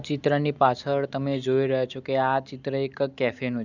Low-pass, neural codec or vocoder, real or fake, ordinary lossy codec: 7.2 kHz; none; real; none